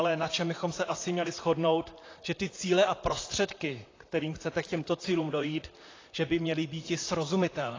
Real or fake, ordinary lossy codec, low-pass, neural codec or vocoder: fake; AAC, 32 kbps; 7.2 kHz; vocoder, 44.1 kHz, 128 mel bands, Pupu-Vocoder